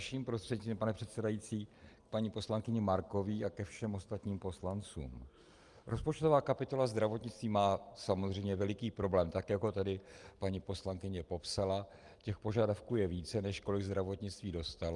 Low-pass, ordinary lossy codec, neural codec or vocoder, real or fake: 10.8 kHz; Opus, 32 kbps; none; real